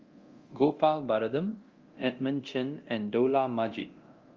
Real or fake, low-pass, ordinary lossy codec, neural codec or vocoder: fake; 7.2 kHz; Opus, 32 kbps; codec, 24 kHz, 0.9 kbps, DualCodec